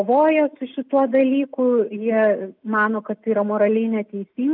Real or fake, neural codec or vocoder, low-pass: real; none; 5.4 kHz